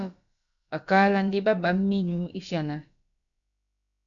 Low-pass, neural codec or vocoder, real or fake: 7.2 kHz; codec, 16 kHz, about 1 kbps, DyCAST, with the encoder's durations; fake